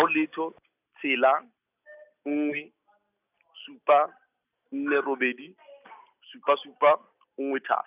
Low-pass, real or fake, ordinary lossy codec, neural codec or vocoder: 3.6 kHz; real; none; none